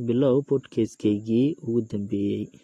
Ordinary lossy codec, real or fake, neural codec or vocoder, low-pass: AAC, 32 kbps; real; none; 19.8 kHz